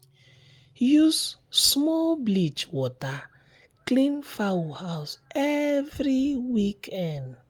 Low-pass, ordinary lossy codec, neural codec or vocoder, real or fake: 19.8 kHz; Opus, 32 kbps; none; real